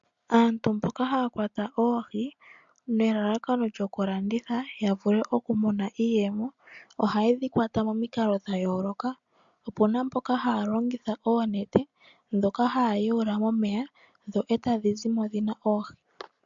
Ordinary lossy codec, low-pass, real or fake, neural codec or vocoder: MP3, 64 kbps; 7.2 kHz; real; none